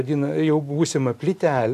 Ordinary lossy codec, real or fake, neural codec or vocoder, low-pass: AAC, 64 kbps; real; none; 14.4 kHz